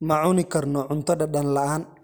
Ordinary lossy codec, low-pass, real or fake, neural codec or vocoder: none; none; real; none